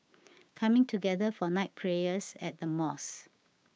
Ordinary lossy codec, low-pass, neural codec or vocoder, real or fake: none; none; codec, 16 kHz, 6 kbps, DAC; fake